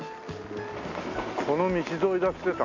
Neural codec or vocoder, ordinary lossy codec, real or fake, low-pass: none; MP3, 64 kbps; real; 7.2 kHz